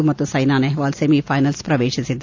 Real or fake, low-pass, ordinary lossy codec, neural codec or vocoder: real; 7.2 kHz; AAC, 48 kbps; none